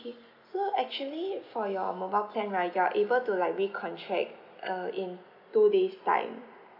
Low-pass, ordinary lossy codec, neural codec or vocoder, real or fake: 5.4 kHz; none; none; real